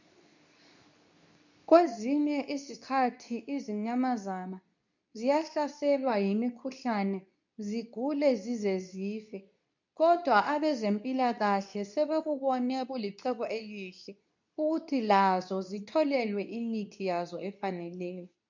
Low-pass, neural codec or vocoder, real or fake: 7.2 kHz; codec, 24 kHz, 0.9 kbps, WavTokenizer, medium speech release version 2; fake